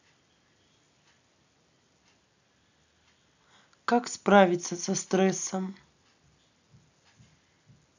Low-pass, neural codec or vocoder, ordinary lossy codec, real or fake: 7.2 kHz; none; none; real